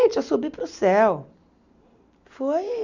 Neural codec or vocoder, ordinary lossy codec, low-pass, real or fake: none; none; 7.2 kHz; real